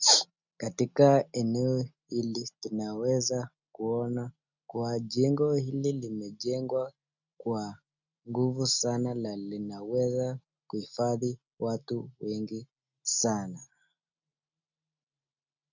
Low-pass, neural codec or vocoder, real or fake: 7.2 kHz; none; real